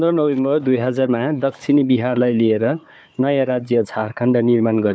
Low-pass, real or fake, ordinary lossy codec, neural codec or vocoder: none; fake; none; codec, 16 kHz, 4 kbps, FunCodec, trained on Chinese and English, 50 frames a second